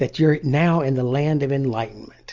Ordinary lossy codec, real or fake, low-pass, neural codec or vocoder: Opus, 32 kbps; real; 7.2 kHz; none